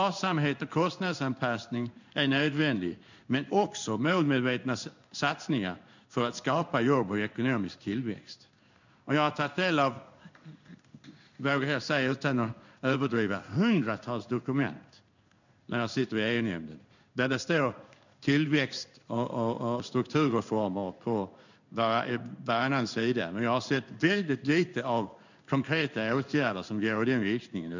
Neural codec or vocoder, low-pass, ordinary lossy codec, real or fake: codec, 16 kHz in and 24 kHz out, 1 kbps, XY-Tokenizer; 7.2 kHz; none; fake